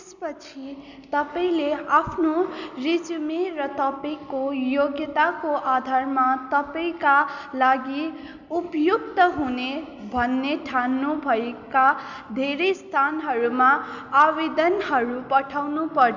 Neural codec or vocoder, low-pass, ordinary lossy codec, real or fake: none; 7.2 kHz; none; real